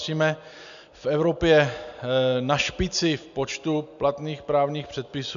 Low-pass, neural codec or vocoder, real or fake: 7.2 kHz; none; real